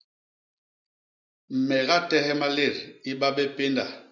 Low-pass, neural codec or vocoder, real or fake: 7.2 kHz; none; real